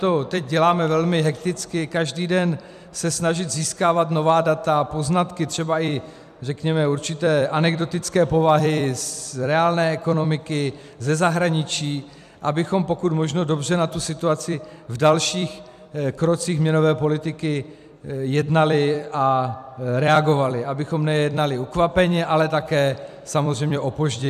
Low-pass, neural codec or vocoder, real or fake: 14.4 kHz; vocoder, 44.1 kHz, 128 mel bands every 256 samples, BigVGAN v2; fake